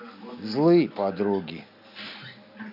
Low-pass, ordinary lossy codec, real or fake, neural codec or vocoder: 5.4 kHz; none; real; none